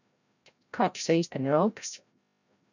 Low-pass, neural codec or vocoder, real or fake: 7.2 kHz; codec, 16 kHz, 0.5 kbps, FreqCodec, larger model; fake